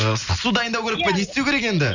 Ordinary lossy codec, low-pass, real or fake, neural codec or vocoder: none; 7.2 kHz; real; none